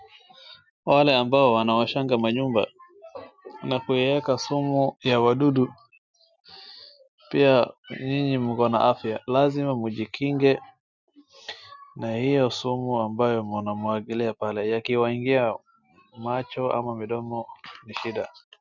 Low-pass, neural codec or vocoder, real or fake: 7.2 kHz; none; real